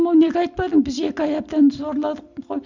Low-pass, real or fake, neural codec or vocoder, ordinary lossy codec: 7.2 kHz; real; none; Opus, 64 kbps